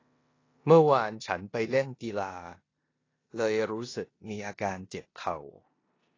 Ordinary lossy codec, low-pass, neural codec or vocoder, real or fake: AAC, 32 kbps; 7.2 kHz; codec, 16 kHz in and 24 kHz out, 0.9 kbps, LongCat-Audio-Codec, four codebook decoder; fake